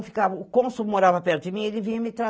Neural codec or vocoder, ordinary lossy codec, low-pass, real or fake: none; none; none; real